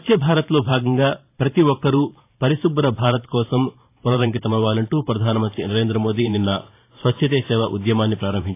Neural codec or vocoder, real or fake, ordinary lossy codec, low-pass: vocoder, 44.1 kHz, 128 mel bands every 512 samples, BigVGAN v2; fake; AAC, 24 kbps; 3.6 kHz